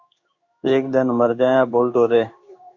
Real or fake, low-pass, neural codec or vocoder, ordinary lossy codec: fake; 7.2 kHz; codec, 16 kHz in and 24 kHz out, 1 kbps, XY-Tokenizer; Opus, 64 kbps